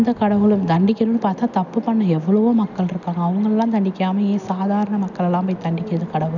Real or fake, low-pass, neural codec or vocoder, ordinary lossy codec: real; 7.2 kHz; none; none